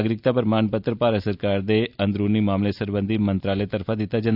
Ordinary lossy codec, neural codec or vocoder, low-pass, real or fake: none; none; 5.4 kHz; real